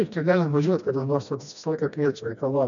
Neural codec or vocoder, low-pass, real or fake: codec, 16 kHz, 1 kbps, FreqCodec, smaller model; 7.2 kHz; fake